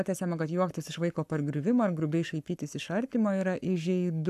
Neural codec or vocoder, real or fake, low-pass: codec, 44.1 kHz, 7.8 kbps, Pupu-Codec; fake; 14.4 kHz